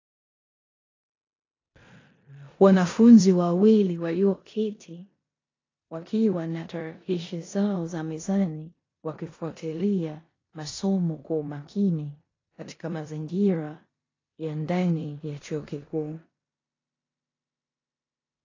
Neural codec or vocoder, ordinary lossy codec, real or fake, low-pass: codec, 16 kHz in and 24 kHz out, 0.9 kbps, LongCat-Audio-Codec, four codebook decoder; AAC, 32 kbps; fake; 7.2 kHz